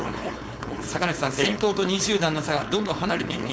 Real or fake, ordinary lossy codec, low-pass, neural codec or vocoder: fake; none; none; codec, 16 kHz, 4.8 kbps, FACodec